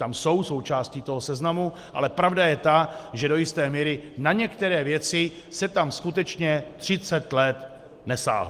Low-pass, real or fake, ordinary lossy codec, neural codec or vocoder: 14.4 kHz; real; Opus, 24 kbps; none